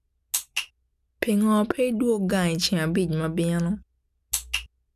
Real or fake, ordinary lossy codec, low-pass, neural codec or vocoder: real; none; 14.4 kHz; none